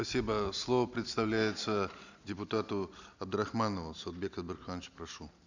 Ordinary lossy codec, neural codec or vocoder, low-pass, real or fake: none; none; 7.2 kHz; real